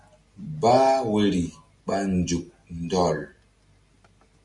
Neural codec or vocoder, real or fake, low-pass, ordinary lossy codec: none; real; 10.8 kHz; MP3, 96 kbps